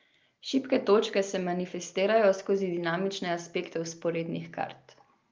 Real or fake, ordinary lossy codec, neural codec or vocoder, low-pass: real; Opus, 24 kbps; none; 7.2 kHz